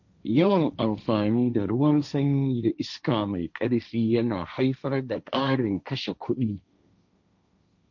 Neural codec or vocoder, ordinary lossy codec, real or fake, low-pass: codec, 16 kHz, 1.1 kbps, Voila-Tokenizer; none; fake; 7.2 kHz